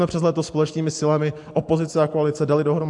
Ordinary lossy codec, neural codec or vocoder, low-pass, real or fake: AAC, 64 kbps; none; 10.8 kHz; real